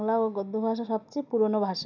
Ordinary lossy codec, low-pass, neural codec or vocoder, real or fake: none; 7.2 kHz; none; real